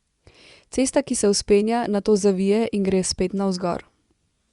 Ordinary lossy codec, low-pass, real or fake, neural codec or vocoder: Opus, 64 kbps; 10.8 kHz; fake; vocoder, 24 kHz, 100 mel bands, Vocos